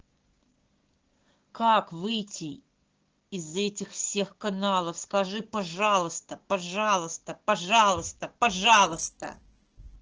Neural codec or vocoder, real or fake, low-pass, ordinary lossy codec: codec, 16 kHz, 6 kbps, DAC; fake; 7.2 kHz; Opus, 16 kbps